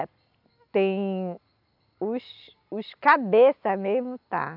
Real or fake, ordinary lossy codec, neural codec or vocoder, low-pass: real; none; none; 5.4 kHz